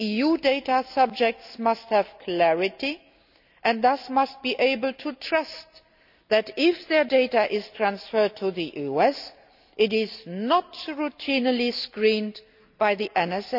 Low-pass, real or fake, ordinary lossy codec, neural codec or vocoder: 5.4 kHz; real; none; none